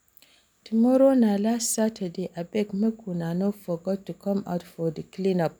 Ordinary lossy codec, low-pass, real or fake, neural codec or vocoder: none; none; real; none